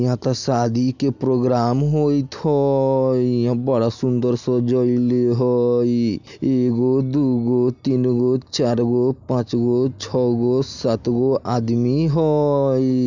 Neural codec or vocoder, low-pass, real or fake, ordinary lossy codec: none; 7.2 kHz; real; none